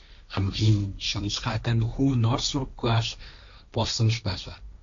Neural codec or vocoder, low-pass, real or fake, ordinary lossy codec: codec, 16 kHz, 1.1 kbps, Voila-Tokenizer; 7.2 kHz; fake; AAC, 64 kbps